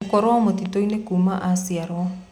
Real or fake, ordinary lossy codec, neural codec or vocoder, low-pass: real; none; none; 19.8 kHz